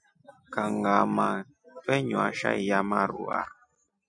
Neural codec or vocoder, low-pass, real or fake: none; 9.9 kHz; real